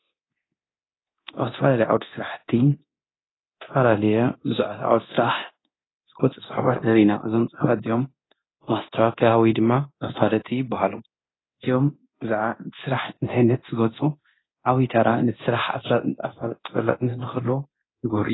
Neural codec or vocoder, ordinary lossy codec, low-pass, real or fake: codec, 24 kHz, 0.9 kbps, DualCodec; AAC, 16 kbps; 7.2 kHz; fake